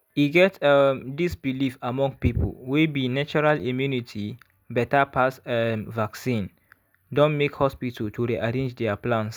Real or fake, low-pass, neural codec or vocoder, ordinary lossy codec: real; none; none; none